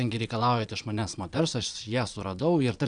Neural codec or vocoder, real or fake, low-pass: vocoder, 22.05 kHz, 80 mel bands, Vocos; fake; 9.9 kHz